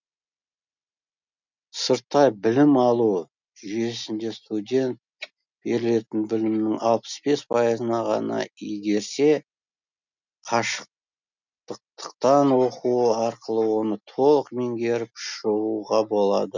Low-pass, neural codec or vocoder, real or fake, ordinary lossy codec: 7.2 kHz; none; real; none